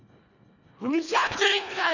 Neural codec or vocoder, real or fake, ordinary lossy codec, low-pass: codec, 24 kHz, 1.5 kbps, HILCodec; fake; none; 7.2 kHz